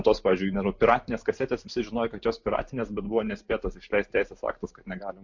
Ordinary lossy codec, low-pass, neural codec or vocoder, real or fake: MP3, 48 kbps; 7.2 kHz; none; real